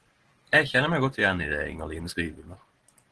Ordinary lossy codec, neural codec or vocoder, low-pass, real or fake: Opus, 16 kbps; none; 10.8 kHz; real